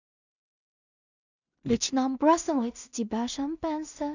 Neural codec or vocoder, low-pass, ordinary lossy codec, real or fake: codec, 16 kHz in and 24 kHz out, 0.4 kbps, LongCat-Audio-Codec, two codebook decoder; 7.2 kHz; none; fake